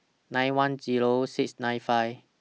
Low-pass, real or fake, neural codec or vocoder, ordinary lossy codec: none; real; none; none